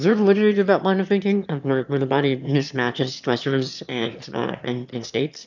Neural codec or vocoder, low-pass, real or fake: autoencoder, 22.05 kHz, a latent of 192 numbers a frame, VITS, trained on one speaker; 7.2 kHz; fake